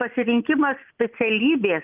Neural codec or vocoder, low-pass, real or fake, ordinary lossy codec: none; 3.6 kHz; real; Opus, 24 kbps